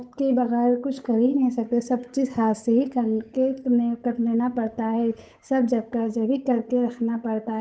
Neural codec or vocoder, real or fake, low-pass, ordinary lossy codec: codec, 16 kHz, 8 kbps, FunCodec, trained on Chinese and English, 25 frames a second; fake; none; none